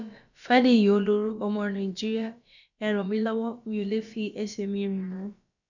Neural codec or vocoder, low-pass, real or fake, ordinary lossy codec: codec, 16 kHz, about 1 kbps, DyCAST, with the encoder's durations; 7.2 kHz; fake; none